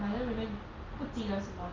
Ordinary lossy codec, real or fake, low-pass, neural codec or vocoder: Opus, 24 kbps; real; 7.2 kHz; none